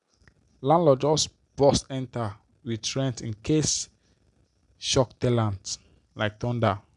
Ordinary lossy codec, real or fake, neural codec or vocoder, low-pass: none; real; none; 10.8 kHz